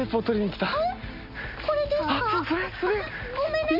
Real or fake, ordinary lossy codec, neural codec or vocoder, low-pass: real; none; none; 5.4 kHz